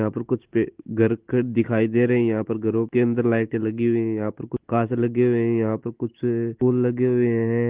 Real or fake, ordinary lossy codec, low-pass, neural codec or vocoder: real; Opus, 16 kbps; 3.6 kHz; none